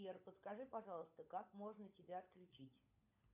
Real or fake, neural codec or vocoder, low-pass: fake; codec, 16 kHz, 16 kbps, FunCodec, trained on LibriTTS, 50 frames a second; 3.6 kHz